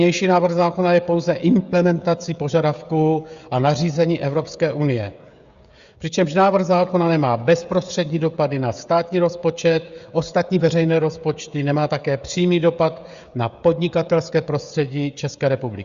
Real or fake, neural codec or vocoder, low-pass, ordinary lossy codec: fake; codec, 16 kHz, 16 kbps, FreqCodec, smaller model; 7.2 kHz; Opus, 64 kbps